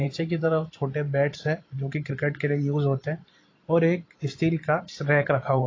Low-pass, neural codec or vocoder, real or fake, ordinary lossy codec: 7.2 kHz; vocoder, 44.1 kHz, 128 mel bands every 512 samples, BigVGAN v2; fake; AAC, 32 kbps